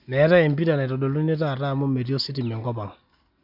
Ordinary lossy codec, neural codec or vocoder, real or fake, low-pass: none; none; real; 5.4 kHz